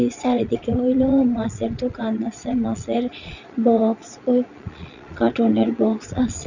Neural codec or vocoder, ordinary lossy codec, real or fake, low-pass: vocoder, 22.05 kHz, 80 mel bands, Vocos; none; fake; 7.2 kHz